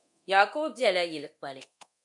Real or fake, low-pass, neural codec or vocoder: fake; 10.8 kHz; codec, 24 kHz, 0.9 kbps, DualCodec